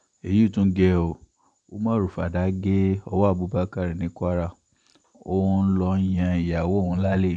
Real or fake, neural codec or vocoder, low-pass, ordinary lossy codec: fake; vocoder, 44.1 kHz, 128 mel bands every 512 samples, BigVGAN v2; 9.9 kHz; none